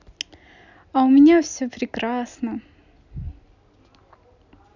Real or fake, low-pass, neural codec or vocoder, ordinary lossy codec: real; 7.2 kHz; none; none